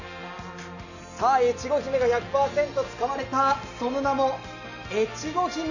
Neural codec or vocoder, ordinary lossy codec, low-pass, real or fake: none; none; 7.2 kHz; real